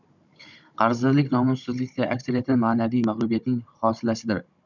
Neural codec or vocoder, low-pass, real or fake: codec, 16 kHz, 16 kbps, FunCodec, trained on Chinese and English, 50 frames a second; 7.2 kHz; fake